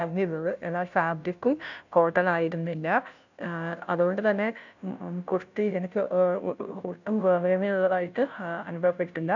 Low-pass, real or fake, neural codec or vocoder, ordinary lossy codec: 7.2 kHz; fake; codec, 16 kHz, 0.5 kbps, FunCodec, trained on Chinese and English, 25 frames a second; none